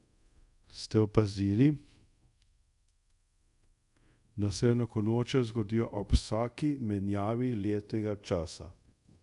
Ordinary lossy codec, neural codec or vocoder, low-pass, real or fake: none; codec, 24 kHz, 0.5 kbps, DualCodec; 10.8 kHz; fake